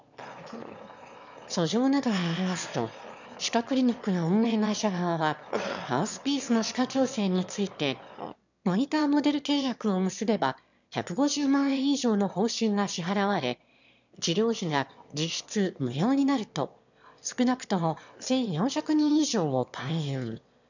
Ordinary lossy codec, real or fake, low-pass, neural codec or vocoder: none; fake; 7.2 kHz; autoencoder, 22.05 kHz, a latent of 192 numbers a frame, VITS, trained on one speaker